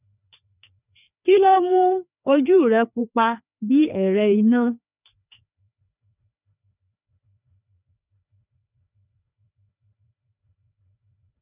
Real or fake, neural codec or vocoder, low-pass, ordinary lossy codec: fake; codec, 16 kHz, 2 kbps, FreqCodec, larger model; 3.6 kHz; none